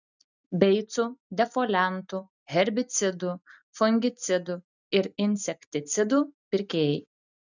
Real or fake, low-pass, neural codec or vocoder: real; 7.2 kHz; none